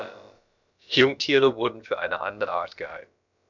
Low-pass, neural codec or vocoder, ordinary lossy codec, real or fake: 7.2 kHz; codec, 16 kHz, about 1 kbps, DyCAST, with the encoder's durations; none; fake